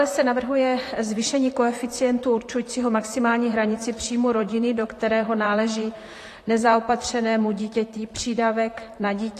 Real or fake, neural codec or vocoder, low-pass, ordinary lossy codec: fake; vocoder, 44.1 kHz, 128 mel bands, Pupu-Vocoder; 14.4 kHz; AAC, 48 kbps